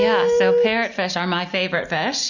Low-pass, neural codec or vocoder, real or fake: 7.2 kHz; none; real